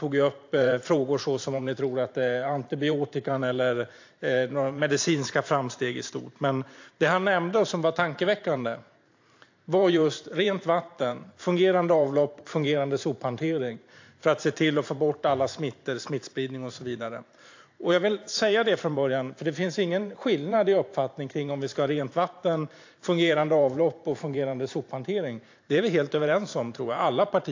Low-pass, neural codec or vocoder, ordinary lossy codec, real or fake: 7.2 kHz; vocoder, 44.1 kHz, 128 mel bands every 512 samples, BigVGAN v2; AAC, 48 kbps; fake